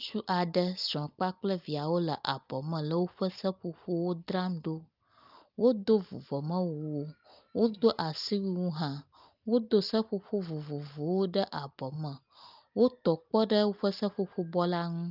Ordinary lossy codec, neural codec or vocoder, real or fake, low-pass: Opus, 24 kbps; none; real; 5.4 kHz